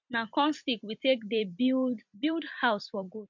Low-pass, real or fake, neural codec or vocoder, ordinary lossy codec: 7.2 kHz; fake; vocoder, 44.1 kHz, 128 mel bands every 256 samples, BigVGAN v2; MP3, 64 kbps